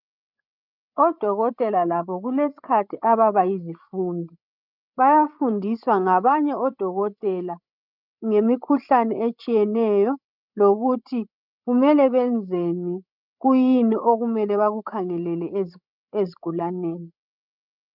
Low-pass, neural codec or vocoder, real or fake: 5.4 kHz; codec, 16 kHz, 16 kbps, FreqCodec, larger model; fake